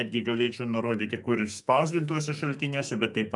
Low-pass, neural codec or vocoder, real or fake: 10.8 kHz; codec, 32 kHz, 1.9 kbps, SNAC; fake